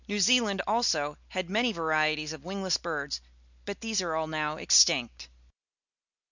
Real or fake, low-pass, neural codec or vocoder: real; 7.2 kHz; none